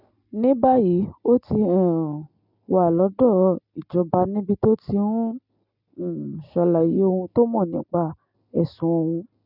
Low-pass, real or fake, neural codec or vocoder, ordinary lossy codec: 5.4 kHz; real; none; none